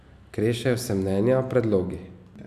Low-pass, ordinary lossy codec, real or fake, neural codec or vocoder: 14.4 kHz; none; real; none